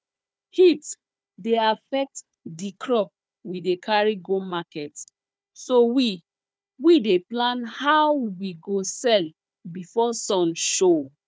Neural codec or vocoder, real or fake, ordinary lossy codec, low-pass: codec, 16 kHz, 4 kbps, FunCodec, trained on Chinese and English, 50 frames a second; fake; none; none